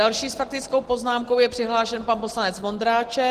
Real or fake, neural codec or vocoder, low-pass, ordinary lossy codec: real; none; 14.4 kHz; Opus, 16 kbps